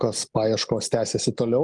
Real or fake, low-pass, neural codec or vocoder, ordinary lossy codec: real; 10.8 kHz; none; Opus, 24 kbps